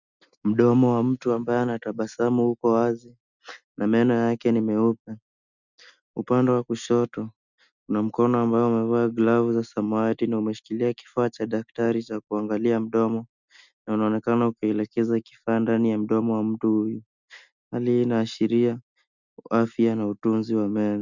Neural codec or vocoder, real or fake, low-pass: none; real; 7.2 kHz